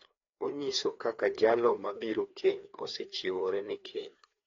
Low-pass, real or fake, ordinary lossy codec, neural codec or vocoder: 7.2 kHz; fake; AAC, 32 kbps; codec, 16 kHz, 2 kbps, FreqCodec, larger model